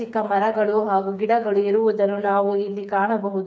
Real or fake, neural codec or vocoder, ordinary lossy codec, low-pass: fake; codec, 16 kHz, 4 kbps, FreqCodec, smaller model; none; none